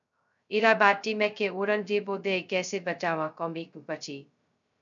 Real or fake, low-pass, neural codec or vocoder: fake; 7.2 kHz; codec, 16 kHz, 0.2 kbps, FocalCodec